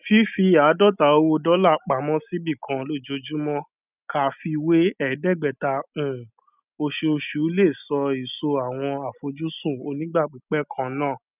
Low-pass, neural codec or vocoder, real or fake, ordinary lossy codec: 3.6 kHz; none; real; none